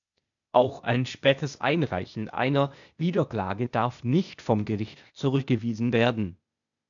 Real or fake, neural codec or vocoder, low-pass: fake; codec, 16 kHz, 0.8 kbps, ZipCodec; 7.2 kHz